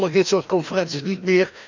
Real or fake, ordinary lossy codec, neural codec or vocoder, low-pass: fake; none; codec, 16 kHz, 1 kbps, FreqCodec, larger model; 7.2 kHz